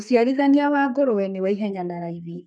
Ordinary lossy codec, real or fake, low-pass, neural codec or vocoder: none; fake; 9.9 kHz; codec, 32 kHz, 1.9 kbps, SNAC